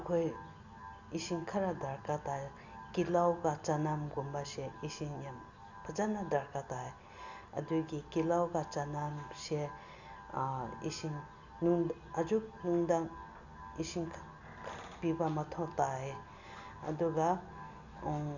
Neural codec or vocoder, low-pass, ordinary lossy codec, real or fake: none; 7.2 kHz; none; real